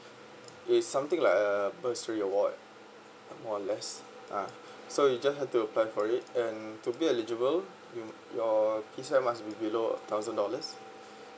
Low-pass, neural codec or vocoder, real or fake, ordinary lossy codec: none; none; real; none